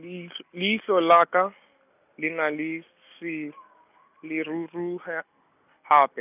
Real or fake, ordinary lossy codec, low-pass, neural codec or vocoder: real; none; 3.6 kHz; none